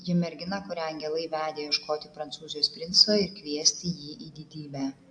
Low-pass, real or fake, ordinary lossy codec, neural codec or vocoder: 9.9 kHz; real; AAC, 64 kbps; none